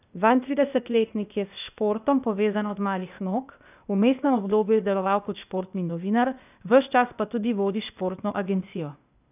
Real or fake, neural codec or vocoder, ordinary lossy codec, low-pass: fake; codec, 16 kHz, 0.8 kbps, ZipCodec; none; 3.6 kHz